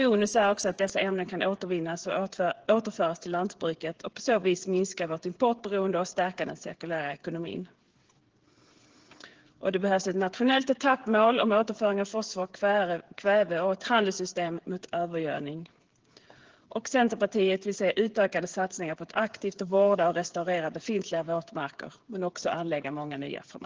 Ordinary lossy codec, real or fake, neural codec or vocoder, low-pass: Opus, 16 kbps; fake; codec, 16 kHz, 8 kbps, FreqCodec, smaller model; 7.2 kHz